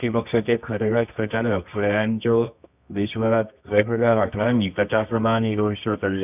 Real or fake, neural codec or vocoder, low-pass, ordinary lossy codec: fake; codec, 24 kHz, 0.9 kbps, WavTokenizer, medium music audio release; 3.6 kHz; none